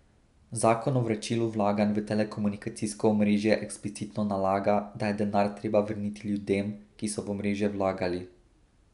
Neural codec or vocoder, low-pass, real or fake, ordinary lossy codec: none; 10.8 kHz; real; none